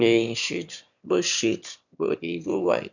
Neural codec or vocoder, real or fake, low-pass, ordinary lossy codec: autoencoder, 22.05 kHz, a latent of 192 numbers a frame, VITS, trained on one speaker; fake; 7.2 kHz; none